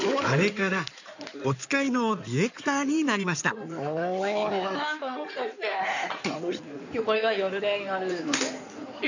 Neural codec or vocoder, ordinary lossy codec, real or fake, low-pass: codec, 16 kHz in and 24 kHz out, 2.2 kbps, FireRedTTS-2 codec; none; fake; 7.2 kHz